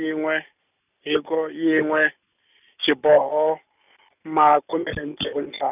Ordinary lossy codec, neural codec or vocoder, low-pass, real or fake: none; none; 3.6 kHz; real